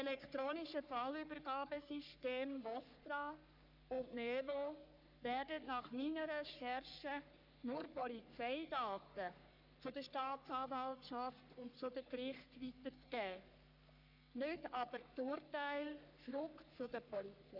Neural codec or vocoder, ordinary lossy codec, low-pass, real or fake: codec, 44.1 kHz, 3.4 kbps, Pupu-Codec; none; 5.4 kHz; fake